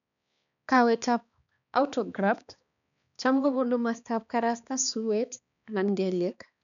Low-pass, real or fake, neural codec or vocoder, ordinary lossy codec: 7.2 kHz; fake; codec, 16 kHz, 2 kbps, X-Codec, HuBERT features, trained on balanced general audio; none